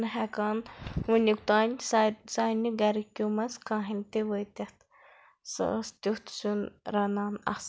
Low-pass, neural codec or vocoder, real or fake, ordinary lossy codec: none; none; real; none